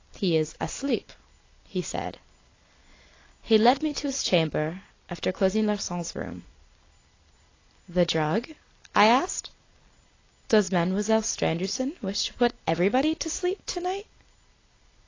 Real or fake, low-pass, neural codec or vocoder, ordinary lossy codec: real; 7.2 kHz; none; AAC, 32 kbps